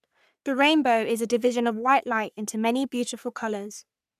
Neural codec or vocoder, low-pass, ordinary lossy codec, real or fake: codec, 44.1 kHz, 3.4 kbps, Pupu-Codec; 14.4 kHz; none; fake